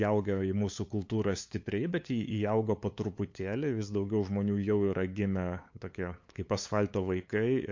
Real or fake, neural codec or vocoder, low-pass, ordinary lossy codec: fake; codec, 16 kHz, 8 kbps, FunCodec, trained on LibriTTS, 25 frames a second; 7.2 kHz; MP3, 48 kbps